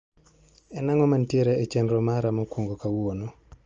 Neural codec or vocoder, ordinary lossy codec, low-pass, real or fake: none; Opus, 24 kbps; 7.2 kHz; real